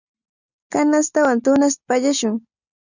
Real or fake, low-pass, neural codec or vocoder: real; 7.2 kHz; none